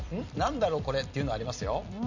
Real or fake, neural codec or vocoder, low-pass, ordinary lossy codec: fake; vocoder, 44.1 kHz, 128 mel bands every 256 samples, BigVGAN v2; 7.2 kHz; none